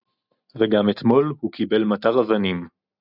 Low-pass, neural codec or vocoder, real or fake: 5.4 kHz; none; real